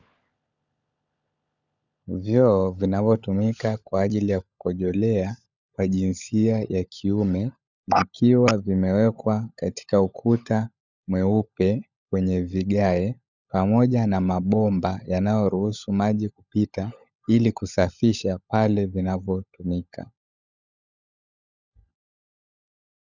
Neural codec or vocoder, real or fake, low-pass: codec, 16 kHz, 16 kbps, FunCodec, trained on LibriTTS, 50 frames a second; fake; 7.2 kHz